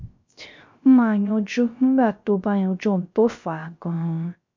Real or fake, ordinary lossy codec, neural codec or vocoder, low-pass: fake; MP3, 64 kbps; codec, 16 kHz, 0.3 kbps, FocalCodec; 7.2 kHz